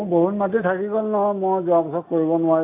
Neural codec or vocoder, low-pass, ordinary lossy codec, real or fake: none; 3.6 kHz; AAC, 24 kbps; real